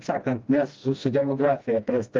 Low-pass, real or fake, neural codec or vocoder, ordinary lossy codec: 7.2 kHz; fake; codec, 16 kHz, 1 kbps, FreqCodec, smaller model; Opus, 32 kbps